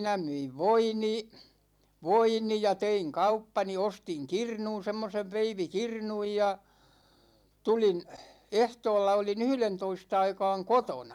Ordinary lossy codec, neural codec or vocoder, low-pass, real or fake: none; vocoder, 44.1 kHz, 128 mel bands every 256 samples, BigVGAN v2; 19.8 kHz; fake